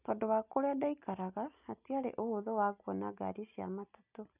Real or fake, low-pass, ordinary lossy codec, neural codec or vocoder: real; 3.6 kHz; none; none